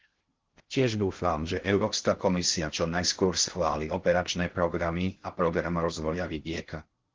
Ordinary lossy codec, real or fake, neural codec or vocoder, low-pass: Opus, 16 kbps; fake; codec, 16 kHz in and 24 kHz out, 0.6 kbps, FocalCodec, streaming, 2048 codes; 7.2 kHz